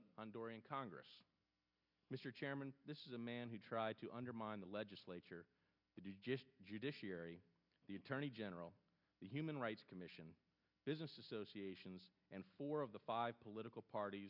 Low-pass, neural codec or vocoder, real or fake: 5.4 kHz; none; real